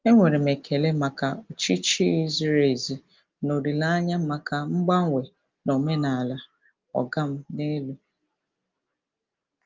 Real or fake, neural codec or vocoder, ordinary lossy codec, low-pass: real; none; Opus, 32 kbps; 7.2 kHz